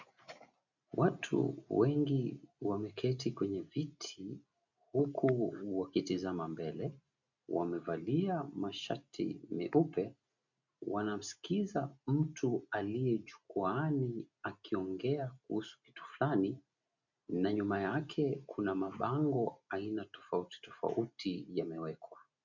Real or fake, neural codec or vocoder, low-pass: real; none; 7.2 kHz